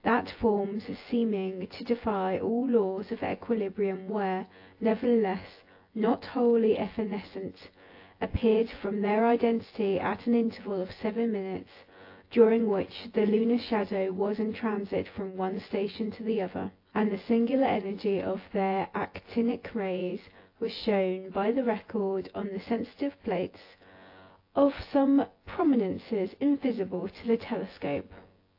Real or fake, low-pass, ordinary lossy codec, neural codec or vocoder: fake; 5.4 kHz; AAC, 32 kbps; vocoder, 24 kHz, 100 mel bands, Vocos